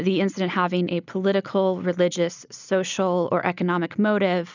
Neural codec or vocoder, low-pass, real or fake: none; 7.2 kHz; real